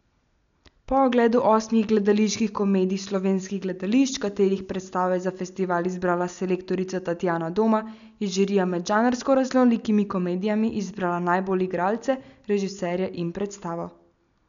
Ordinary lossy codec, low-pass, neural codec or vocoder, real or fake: none; 7.2 kHz; none; real